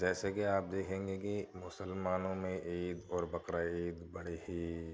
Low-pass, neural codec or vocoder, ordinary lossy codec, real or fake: none; none; none; real